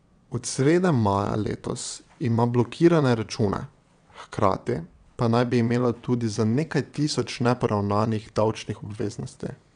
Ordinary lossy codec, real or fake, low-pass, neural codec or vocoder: none; fake; 9.9 kHz; vocoder, 22.05 kHz, 80 mel bands, WaveNeXt